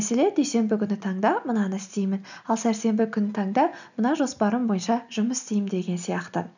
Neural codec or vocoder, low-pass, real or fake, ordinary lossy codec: none; 7.2 kHz; real; none